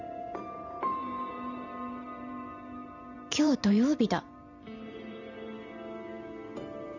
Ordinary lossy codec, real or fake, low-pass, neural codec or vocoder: none; fake; 7.2 kHz; vocoder, 44.1 kHz, 128 mel bands every 512 samples, BigVGAN v2